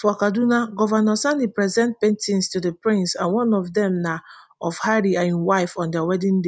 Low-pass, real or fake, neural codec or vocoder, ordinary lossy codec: none; real; none; none